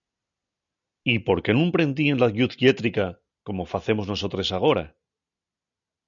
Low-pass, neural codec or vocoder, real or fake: 7.2 kHz; none; real